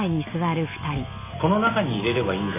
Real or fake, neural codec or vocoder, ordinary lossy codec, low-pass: real; none; MP3, 24 kbps; 3.6 kHz